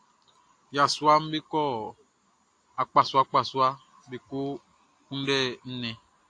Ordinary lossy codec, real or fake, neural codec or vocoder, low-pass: AAC, 48 kbps; real; none; 9.9 kHz